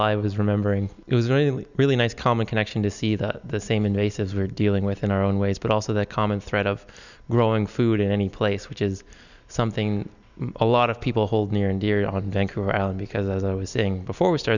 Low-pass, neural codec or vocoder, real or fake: 7.2 kHz; none; real